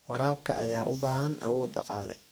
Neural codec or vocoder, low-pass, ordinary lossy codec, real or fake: codec, 44.1 kHz, 2.6 kbps, DAC; none; none; fake